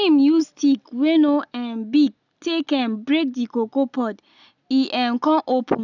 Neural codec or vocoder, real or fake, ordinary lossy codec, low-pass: none; real; none; 7.2 kHz